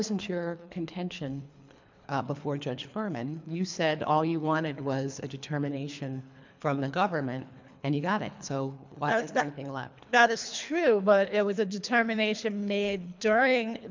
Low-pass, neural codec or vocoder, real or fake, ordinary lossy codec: 7.2 kHz; codec, 24 kHz, 3 kbps, HILCodec; fake; MP3, 64 kbps